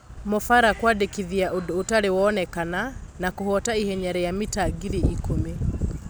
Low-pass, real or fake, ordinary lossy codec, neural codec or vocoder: none; real; none; none